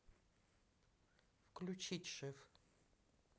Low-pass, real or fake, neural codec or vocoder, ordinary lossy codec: none; real; none; none